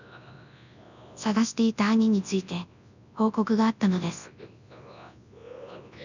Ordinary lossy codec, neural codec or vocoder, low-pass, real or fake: none; codec, 24 kHz, 0.9 kbps, WavTokenizer, large speech release; 7.2 kHz; fake